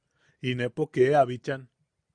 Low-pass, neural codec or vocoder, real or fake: 9.9 kHz; none; real